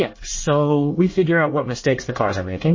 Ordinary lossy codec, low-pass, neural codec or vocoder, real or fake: MP3, 32 kbps; 7.2 kHz; codec, 24 kHz, 1 kbps, SNAC; fake